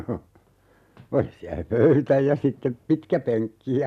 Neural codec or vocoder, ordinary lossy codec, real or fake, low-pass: none; MP3, 96 kbps; real; 14.4 kHz